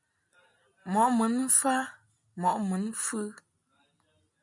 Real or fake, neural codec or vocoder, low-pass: real; none; 10.8 kHz